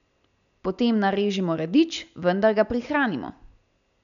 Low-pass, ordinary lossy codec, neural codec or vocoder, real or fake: 7.2 kHz; none; none; real